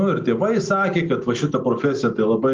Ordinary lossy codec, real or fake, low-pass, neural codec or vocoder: Opus, 32 kbps; real; 7.2 kHz; none